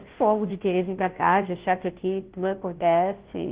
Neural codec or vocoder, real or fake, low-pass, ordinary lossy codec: codec, 16 kHz, 0.5 kbps, FunCodec, trained on Chinese and English, 25 frames a second; fake; 3.6 kHz; Opus, 16 kbps